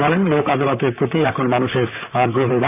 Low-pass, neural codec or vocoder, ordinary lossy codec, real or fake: 3.6 kHz; vocoder, 44.1 kHz, 128 mel bands, Pupu-Vocoder; AAC, 32 kbps; fake